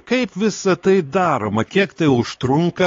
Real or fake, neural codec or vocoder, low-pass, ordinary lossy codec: fake; codec, 16 kHz, 4 kbps, X-Codec, HuBERT features, trained on LibriSpeech; 7.2 kHz; AAC, 32 kbps